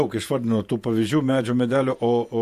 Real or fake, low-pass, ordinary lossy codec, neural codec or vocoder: fake; 14.4 kHz; MP3, 64 kbps; vocoder, 48 kHz, 128 mel bands, Vocos